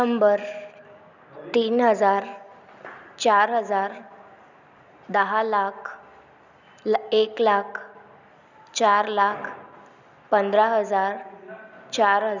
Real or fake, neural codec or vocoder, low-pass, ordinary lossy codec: real; none; 7.2 kHz; none